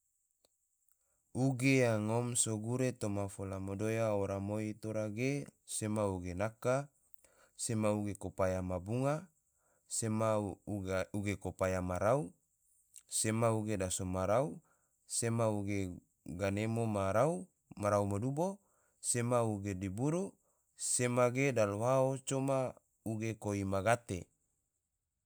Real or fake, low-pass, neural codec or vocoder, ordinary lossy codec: real; none; none; none